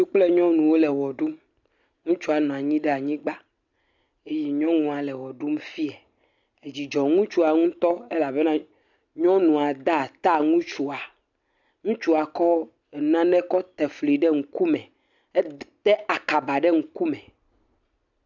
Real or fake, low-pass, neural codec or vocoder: real; 7.2 kHz; none